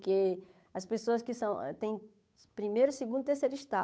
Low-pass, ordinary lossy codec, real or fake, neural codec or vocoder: none; none; fake; codec, 16 kHz, 8 kbps, FunCodec, trained on Chinese and English, 25 frames a second